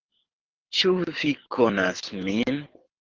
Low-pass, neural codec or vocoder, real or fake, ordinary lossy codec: 7.2 kHz; codec, 24 kHz, 6 kbps, HILCodec; fake; Opus, 16 kbps